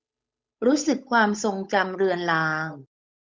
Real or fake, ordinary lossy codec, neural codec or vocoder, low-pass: fake; none; codec, 16 kHz, 8 kbps, FunCodec, trained on Chinese and English, 25 frames a second; none